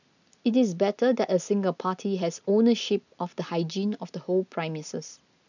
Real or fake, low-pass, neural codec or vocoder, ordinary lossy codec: real; 7.2 kHz; none; none